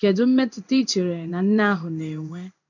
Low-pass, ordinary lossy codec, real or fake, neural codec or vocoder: 7.2 kHz; none; fake; codec, 16 kHz in and 24 kHz out, 1 kbps, XY-Tokenizer